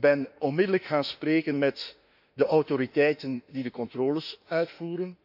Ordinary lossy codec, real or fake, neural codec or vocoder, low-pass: AAC, 48 kbps; fake; autoencoder, 48 kHz, 32 numbers a frame, DAC-VAE, trained on Japanese speech; 5.4 kHz